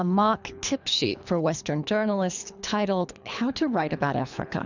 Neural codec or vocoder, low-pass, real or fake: codec, 24 kHz, 3 kbps, HILCodec; 7.2 kHz; fake